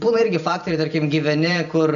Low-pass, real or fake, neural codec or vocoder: 7.2 kHz; real; none